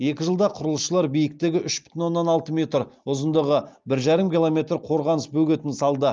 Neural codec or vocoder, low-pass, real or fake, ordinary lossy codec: none; 7.2 kHz; real; Opus, 32 kbps